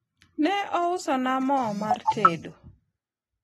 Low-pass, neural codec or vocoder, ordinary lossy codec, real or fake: 19.8 kHz; none; AAC, 32 kbps; real